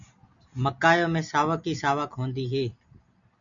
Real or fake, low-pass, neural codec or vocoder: real; 7.2 kHz; none